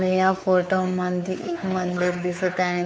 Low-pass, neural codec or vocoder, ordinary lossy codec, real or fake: none; codec, 16 kHz, 4 kbps, X-Codec, WavLM features, trained on Multilingual LibriSpeech; none; fake